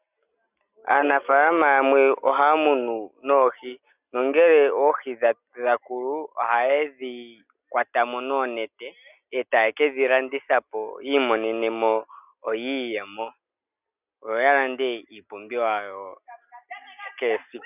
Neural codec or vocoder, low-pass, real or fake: none; 3.6 kHz; real